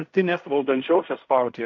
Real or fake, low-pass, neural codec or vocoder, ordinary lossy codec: fake; 7.2 kHz; codec, 16 kHz in and 24 kHz out, 0.4 kbps, LongCat-Audio-Codec, fine tuned four codebook decoder; MP3, 64 kbps